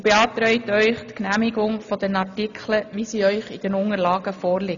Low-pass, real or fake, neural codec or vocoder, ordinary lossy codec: 7.2 kHz; real; none; none